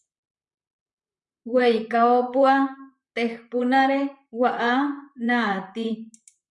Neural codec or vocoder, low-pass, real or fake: vocoder, 44.1 kHz, 128 mel bands, Pupu-Vocoder; 10.8 kHz; fake